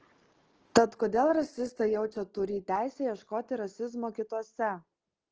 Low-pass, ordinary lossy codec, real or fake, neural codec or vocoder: 7.2 kHz; Opus, 16 kbps; real; none